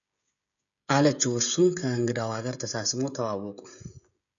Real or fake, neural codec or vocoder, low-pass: fake; codec, 16 kHz, 16 kbps, FreqCodec, smaller model; 7.2 kHz